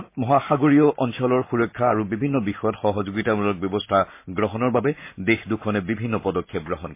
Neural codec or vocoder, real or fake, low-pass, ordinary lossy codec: none; real; 3.6 kHz; MP3, 24 kbps